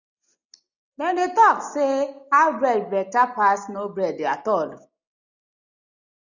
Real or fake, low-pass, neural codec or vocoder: real; 7.2 kHz; none